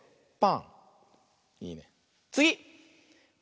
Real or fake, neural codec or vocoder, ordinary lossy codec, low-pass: real; none; none; none